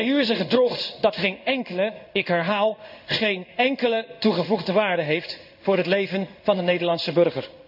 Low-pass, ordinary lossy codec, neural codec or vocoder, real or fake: 5.4 kHz; none; codec, 16 kHz in and 24 kHz out, 1 kbps, XY-Tokenizer; fake